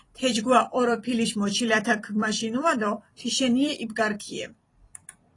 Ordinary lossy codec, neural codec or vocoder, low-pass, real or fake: AAC, 32 kbps; vocoder, 44.1 kHz, 128 mel bands every 256 samples, BigVGAN v2; 10.8 kHz; fake